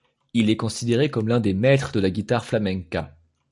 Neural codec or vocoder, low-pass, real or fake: none; 10.8 kHz; real